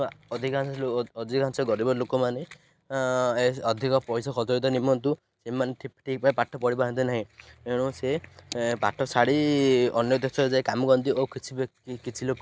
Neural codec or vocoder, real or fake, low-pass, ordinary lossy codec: none; real; none; none